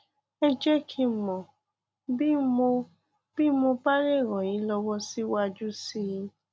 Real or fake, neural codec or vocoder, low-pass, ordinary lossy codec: real; none; none; none